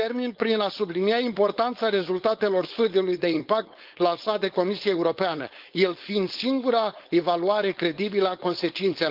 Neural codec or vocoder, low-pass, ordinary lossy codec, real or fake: codec, 16 kHz, 4.8 kbps, FACodec; 5.4 kHz; Opus, 24 kbps; fake